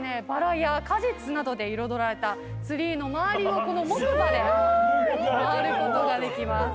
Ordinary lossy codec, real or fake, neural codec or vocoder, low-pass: none; real; none; none